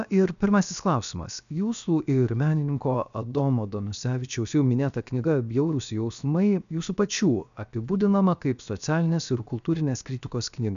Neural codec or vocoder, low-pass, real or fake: codec, 16 kHz, 0.7 kbps, FocalCodec; 7.2 kHz; fake